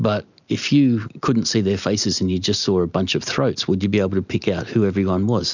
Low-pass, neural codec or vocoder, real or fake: 7.2 kHz; none; real